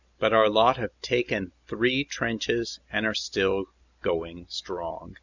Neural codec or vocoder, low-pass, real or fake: none; 7.2 kHz; real